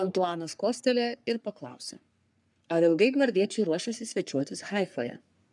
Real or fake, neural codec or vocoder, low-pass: fake; codec, 44.1 kHz, 3.4 kbps, Pupu-Codec; 10.8 kHz